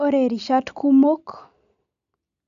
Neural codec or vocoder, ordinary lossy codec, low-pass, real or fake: none; none; 7.2 kHz; real